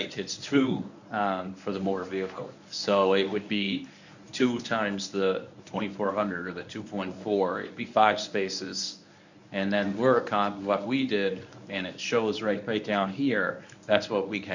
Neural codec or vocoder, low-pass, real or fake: codec, 24 kHz, 0.9 kbps, WavTokenizer, medium speech release version 1; 7.2 kHz; fake